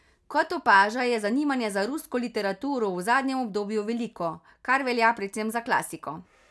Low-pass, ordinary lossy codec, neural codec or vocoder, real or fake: none; none; none; real